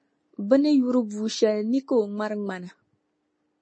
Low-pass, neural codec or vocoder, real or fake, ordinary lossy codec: 9.9 kHz; none; real; MP3, 32 kbps